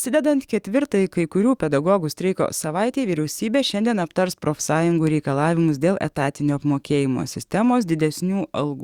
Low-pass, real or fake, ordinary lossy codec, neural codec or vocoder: 19.8 kHz; fake; Opus, 64 kbps; codec, 44.1 kHz, 7.8 kbps, DAC